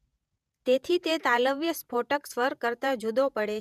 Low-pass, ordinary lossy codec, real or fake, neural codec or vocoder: 14.4 kHz; none; fake; vocoder, 48 kHz, 128 mel bands, Vocos